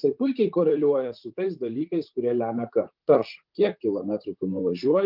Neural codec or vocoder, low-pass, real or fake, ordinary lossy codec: vocoder, 44.1 kHz, 128 mel bands, Pupu-Vocoder; 5.4 kHz; fake; Opus, 24 kbps